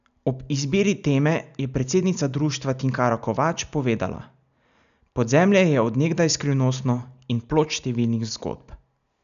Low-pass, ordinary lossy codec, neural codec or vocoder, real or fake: 7.2 kHz; none; none; real